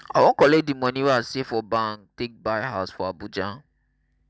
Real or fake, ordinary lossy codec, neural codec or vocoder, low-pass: real; none; none; none